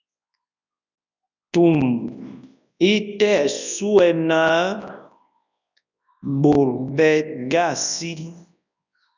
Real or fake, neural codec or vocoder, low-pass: fake; codec, 24 kHz, 0.9 kbps, WavTokenizer, large speech release; 7.2 kHz